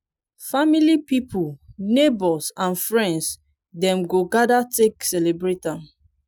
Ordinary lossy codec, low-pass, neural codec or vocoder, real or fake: none; none; none; real